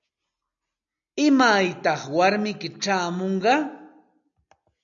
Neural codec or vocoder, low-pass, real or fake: none; 7.2 kHz; real